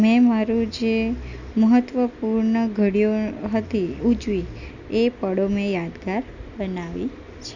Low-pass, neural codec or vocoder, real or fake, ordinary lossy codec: 7.2 kHz; none; real; none